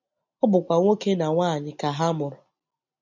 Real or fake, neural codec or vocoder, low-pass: real; none; 7.2 kHz